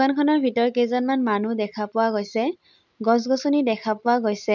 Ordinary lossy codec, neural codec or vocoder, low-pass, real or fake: none; none; 7.2 kHz; real